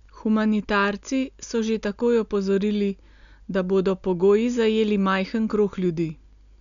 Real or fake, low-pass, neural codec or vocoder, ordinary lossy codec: real; 7.2 kHz; none; none